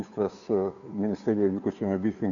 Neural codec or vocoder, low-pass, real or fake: codec, 16 kHz, 2 kbps, FunCodec, trained on Chinese and English, 25 frames a second; 7.2 kHz; fake